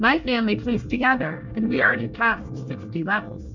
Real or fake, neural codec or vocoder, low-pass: fake; codec, 24 kHz, 1 kbps, SNAC; 7.2 kHz